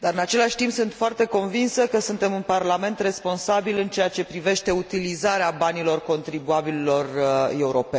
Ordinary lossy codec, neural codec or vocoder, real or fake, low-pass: none; none; real; none